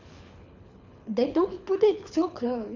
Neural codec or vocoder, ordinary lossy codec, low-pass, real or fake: codec, 24 kHz, 6 kbps, HILCodec; none; 7.2 kHz; fake